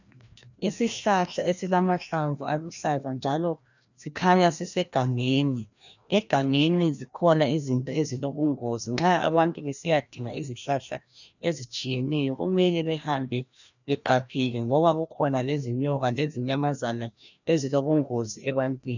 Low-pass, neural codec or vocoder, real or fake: 7.2 kHz; codec, 16 kHz, 1 kbps, FreqCodec, larger model; fake